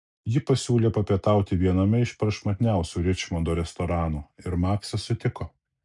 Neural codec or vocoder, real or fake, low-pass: none; real; 10.8 kHz